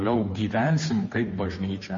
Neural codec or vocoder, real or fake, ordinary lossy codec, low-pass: codec, 16 kHz, 2 kbps, FunCodec, trained on Chinese and English, 25 frames a second; fake; MP3, 32 kbps; 7.2 kHz